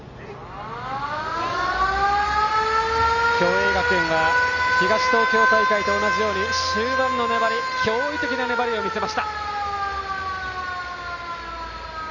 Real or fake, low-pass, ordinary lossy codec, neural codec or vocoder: real; 7.2 kHz; none; none